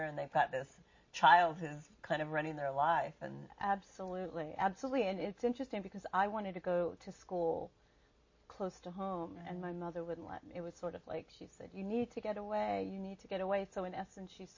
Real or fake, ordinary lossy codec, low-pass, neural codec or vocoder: real; MP3, 32 kbps; 7.2 kHz; none